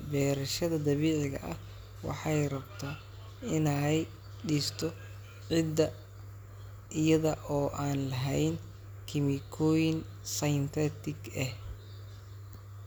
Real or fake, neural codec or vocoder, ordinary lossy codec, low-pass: real; none; none; none